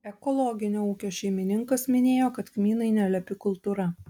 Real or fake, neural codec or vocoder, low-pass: real; none; 19.8 kHz